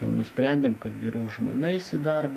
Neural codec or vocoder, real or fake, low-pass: codec, 44.1 kHz, 2.6 kbps, SNAC; fake; 14.4 kHz